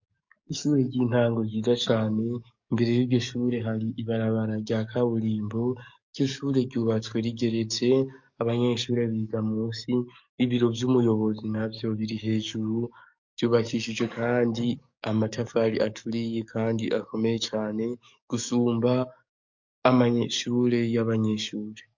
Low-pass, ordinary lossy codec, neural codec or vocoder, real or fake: 7.2 kHz; MP3, 48 kbps; codec, 44.1 kHz, 7.8 kbps, DAC; fake